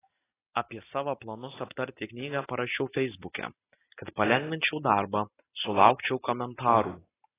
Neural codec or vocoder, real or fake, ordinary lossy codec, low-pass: none; real; AAC, 16 kbps; 3.6 kHz